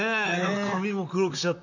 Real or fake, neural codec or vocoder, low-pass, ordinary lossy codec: fake; vocoder, 44.1 kHz, 128 mel bands, Pupu-Vocoder; 7.2 kHz; none